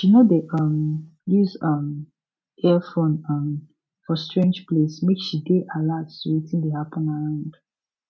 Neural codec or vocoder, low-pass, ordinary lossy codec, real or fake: none; none; none; real